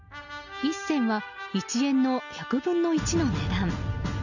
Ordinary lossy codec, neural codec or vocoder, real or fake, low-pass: none; none; real; 7.2 kHz